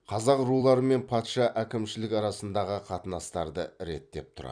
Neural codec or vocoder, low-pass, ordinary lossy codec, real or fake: vocoder, 44.1 kHz, 128 mel bands every 256 samples, BigVGAN v2; 9.9 kHz; none; fake